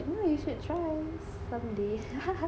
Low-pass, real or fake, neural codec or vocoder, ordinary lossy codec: none; real; none; none